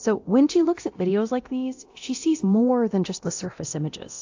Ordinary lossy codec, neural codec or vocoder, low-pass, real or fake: AAC, 48 kbps; codec, 24 kHz, 0.9 kbps, DualCodec; 7.2 kHz; fake